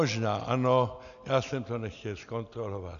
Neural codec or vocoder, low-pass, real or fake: none; 7.2 kHz; real